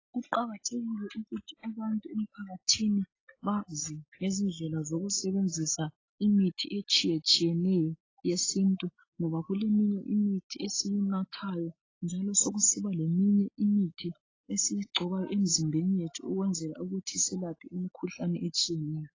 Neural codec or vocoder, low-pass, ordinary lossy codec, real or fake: none; 7.2 kHz; AAC, 32 kbps; real